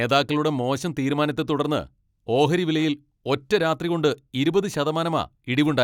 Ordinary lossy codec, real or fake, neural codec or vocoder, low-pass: none; real; none; 19.8 kHz